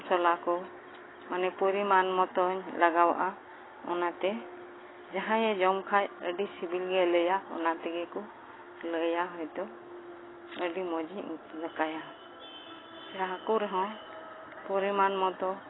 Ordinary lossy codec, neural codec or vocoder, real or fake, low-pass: AAC, 16 kbps; none; real; 7.2 kHz